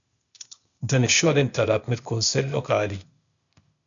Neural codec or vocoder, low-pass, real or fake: codec, 16 kHz, 0.8 kbps, ZipCodec; 7.2 kHz; fake